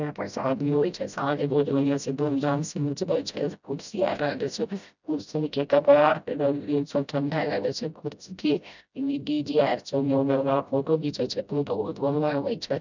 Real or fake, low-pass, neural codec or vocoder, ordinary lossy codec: fake; 7.2 kHz; codec, 16 kHz, 0.5 kbps, FreqCodec, smaller model; none